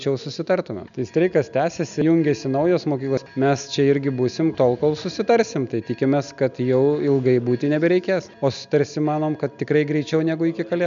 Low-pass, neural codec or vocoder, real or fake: 7.2 kHz; none; real